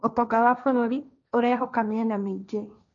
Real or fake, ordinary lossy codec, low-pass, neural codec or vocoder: fake; none; 7.2 kHz; codec, 16 kHz, 1.1 kbps, Voila-Tokenizer